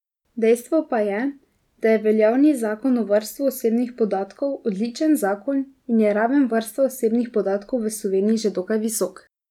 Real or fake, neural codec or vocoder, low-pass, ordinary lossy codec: real; none; 19.8 kHz; none